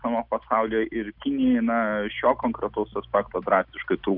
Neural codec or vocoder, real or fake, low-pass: none; real; 5.4 kHz